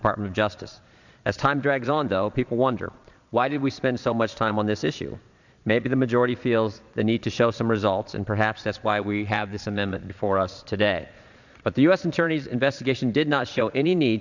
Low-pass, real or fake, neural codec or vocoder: 7.2 kHz; fake; vocoder, 22.05 kHz, 80 mel bands, Vocos